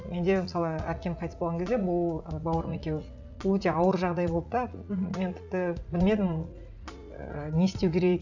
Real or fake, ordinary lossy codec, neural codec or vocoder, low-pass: fake; none; vocoder, 44.1 kHz, 80 mel bands, Vocos; 7.2 kHz